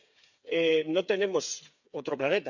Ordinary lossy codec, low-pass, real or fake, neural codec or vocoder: none; 7.2 kHz; fake; codec, 16 kHz, 8 kbps, FreqCodec, smaller model